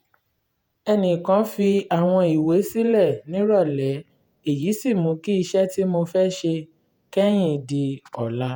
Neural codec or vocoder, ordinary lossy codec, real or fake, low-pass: vocoder, 48 kHz, 128 mel bands, Vocos; none; fake; none